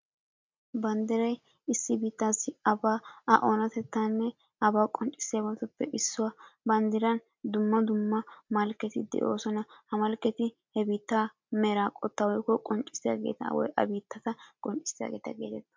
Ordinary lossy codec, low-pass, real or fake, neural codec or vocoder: MP3, 64 kbps; 7.2 kHz; real; none